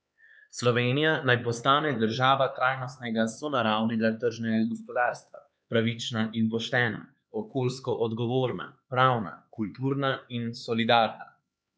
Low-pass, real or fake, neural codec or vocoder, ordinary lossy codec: none; fake; codec, 16 kHz, 4 kbps, X-Codec, HuBERT features, trained on LibriSpeech; none